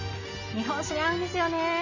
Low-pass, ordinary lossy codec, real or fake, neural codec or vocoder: 7.2 kHz; none; real; none